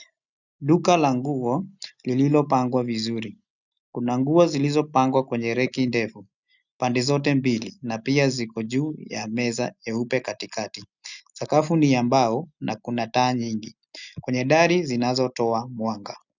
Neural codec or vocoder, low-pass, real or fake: none; 7.2 kHz; real